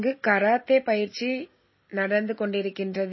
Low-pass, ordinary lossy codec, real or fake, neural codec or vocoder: 7.2 kHz; MP3, 24 kbps; real; none